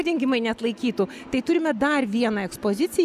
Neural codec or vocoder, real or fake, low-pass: none; real; 14.4 kHz